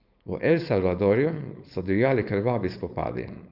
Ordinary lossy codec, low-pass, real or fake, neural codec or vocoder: Opus, 64 kbps; 5.4 kHz; fake; codec, 16 kHz, 4.8 kbps, FACodec